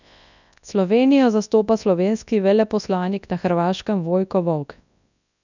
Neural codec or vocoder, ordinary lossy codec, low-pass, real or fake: codec, 24 kHz, 0.9 kbps, WavTokenizer, large speech release; none; 7.2 kHz; fake